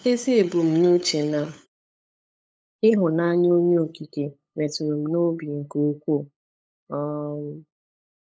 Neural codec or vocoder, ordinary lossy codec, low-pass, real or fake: codec, 16 kHz, 8 kbps, FunCodec, trained on LibriTTS, 25 frames a second; none; none; fake